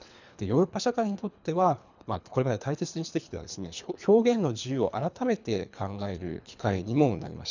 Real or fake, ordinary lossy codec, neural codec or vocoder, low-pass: fake; none; codec, 24 kHz, 3 kbps, HILCodec; 7.2 kHz